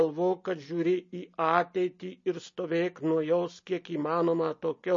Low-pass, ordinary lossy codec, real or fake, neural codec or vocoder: 7.2 kHz; MP3, 32 kbps; real; none